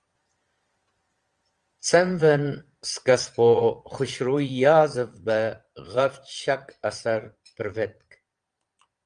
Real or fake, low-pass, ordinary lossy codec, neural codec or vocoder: fake; 9.9 kHz; Opus, 32 kbps; vocoder, 22.05 kHz, 80 mel bands, Vocos